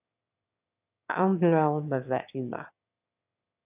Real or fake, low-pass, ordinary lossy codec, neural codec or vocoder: fake; 3.6 kHz; AAC, 32 kbps; autoencoder, 22.05 kHz, a latent of 192 numbers a frame, VITS, trained on one speaker